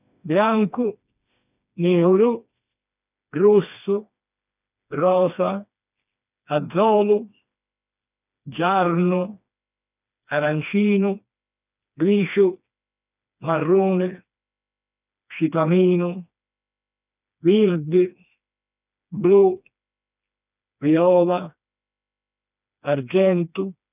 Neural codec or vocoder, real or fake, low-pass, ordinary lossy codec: codec, 16 kHz, 2 kbps, FreqCodec, smaller model; fake; 3.6 kHz; none